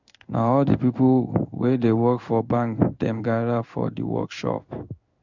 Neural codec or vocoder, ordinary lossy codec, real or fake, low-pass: codec, 16 kHz in and 24 kHz out, 1 kbps, XY-Tokenizer; none; fake; 7.2 kHz